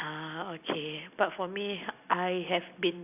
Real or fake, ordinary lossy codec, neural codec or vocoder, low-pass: real; none; none; 3.6 kHz